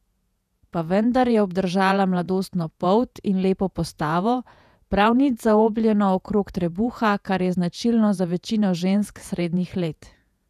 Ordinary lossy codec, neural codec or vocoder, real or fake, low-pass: none; vocoder, 48 kHz, 128 mel bands, Vocos; fake; 14.4 kHz